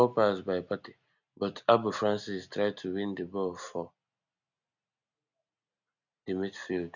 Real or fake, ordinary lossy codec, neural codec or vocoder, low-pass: real; none; none; 7.2 kHz